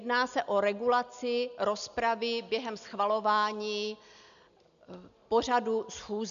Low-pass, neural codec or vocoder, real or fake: 7.2 kHz; none; real